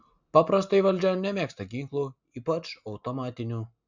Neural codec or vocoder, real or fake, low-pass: none; real; 7.2 kHz